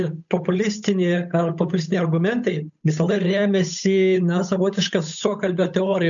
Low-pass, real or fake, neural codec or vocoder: 7.2 kHz; fake; codec, 16 kHz, 8 kbps, FunCodec, trained on Chinese and English, 25 frames a second